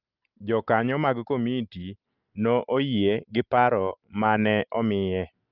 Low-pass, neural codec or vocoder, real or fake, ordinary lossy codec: 5.4 kHz; none; real; Opus, 24 kbps